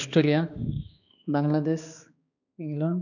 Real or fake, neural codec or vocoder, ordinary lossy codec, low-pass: fake; codec, 16 kHz, 2 kbps, X-Codec, HuBERT features, trained on balanced general audio; none; 7.2 kHz